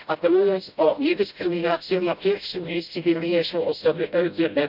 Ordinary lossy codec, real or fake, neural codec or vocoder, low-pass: none; fake; codec, 16 kHz, 0.5 kbps, FreqCodec, smaller model; 5.4 kHz